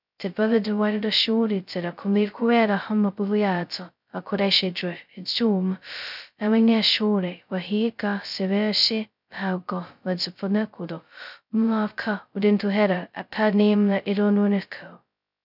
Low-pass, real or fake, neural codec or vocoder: 5.4 kHz; fake; codec, 16 kHz, 0.2 kbps, FocalCodec